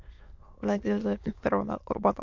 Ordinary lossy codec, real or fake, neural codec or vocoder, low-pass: MP3, 48 kbps; fake; autoencoder, 22.05 kHz, a latent of 192 numbers a frame, VITS, trained on many speakers; 7.2 kHz